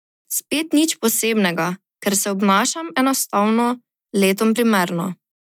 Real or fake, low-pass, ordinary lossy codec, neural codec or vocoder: real; 19.8 kHz; none; none